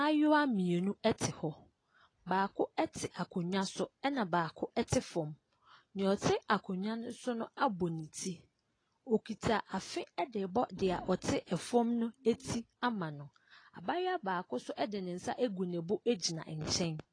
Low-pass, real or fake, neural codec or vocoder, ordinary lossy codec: 9.9 kHz; real; none; AAC, 32 kbps